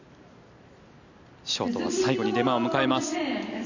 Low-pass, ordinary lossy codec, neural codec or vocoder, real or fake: 7.2 kHz; none; none; real